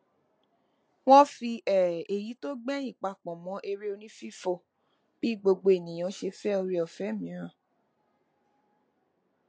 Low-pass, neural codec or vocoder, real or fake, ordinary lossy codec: none; none; real; none